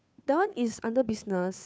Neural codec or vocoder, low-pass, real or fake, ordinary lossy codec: codec, 16 kHz, 2 kbps, FunCodec, trained on Chinese and English, 25 frames a second; none; fake; none